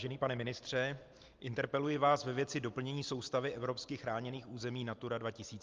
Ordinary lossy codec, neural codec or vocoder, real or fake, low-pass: Opus, 32 kbps; none; real; 7.2 kHz